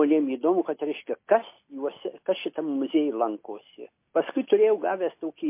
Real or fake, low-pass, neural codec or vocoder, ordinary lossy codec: real; 3.6 kHz; none; MP3, 24 kbps